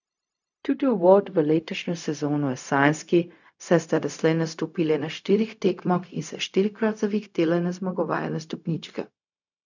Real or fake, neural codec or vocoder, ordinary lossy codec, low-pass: fake; codec, 16 kHz, 0.4 kbps, LongCat-Audio-Codec; none; 7.2 kHz